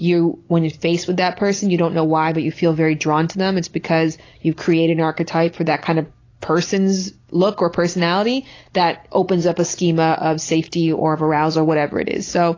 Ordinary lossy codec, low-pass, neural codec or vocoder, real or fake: AAC, 32 kbps; 7.2 kHz; none; real